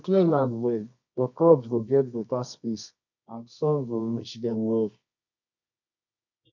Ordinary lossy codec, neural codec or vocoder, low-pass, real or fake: none; codec, 24 kHz, 0.9 kbps, WavTokenizer, medium music audio release; 7.2 kHz; fake